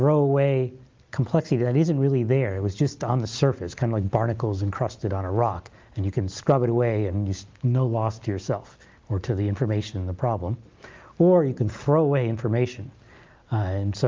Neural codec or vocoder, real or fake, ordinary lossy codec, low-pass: none; real; Opus, 32 kbps; 7.2 kHz